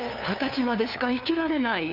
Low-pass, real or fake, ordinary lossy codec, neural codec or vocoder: 5.4 kHz; fake; none; codec, 16 kHz, 2 kbps, FunCodec, trained on LibriTTS, 25 frames a second